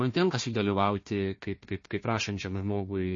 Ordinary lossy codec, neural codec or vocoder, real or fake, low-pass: MP3, 32 kbps; codec, 16 kHz, 1 kbps, FunCodec, trained on Chinese and English, 50 frames a second; fake; 7.2 kHz